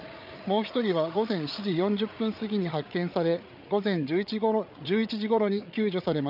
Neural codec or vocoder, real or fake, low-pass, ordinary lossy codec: codec, 16 kHz, 8 kbps, FreqCodec, larger model; fake; 5.4 kHz; none